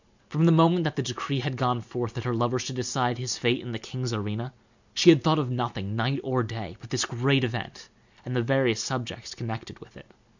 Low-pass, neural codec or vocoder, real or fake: 7.2 kHz; none; real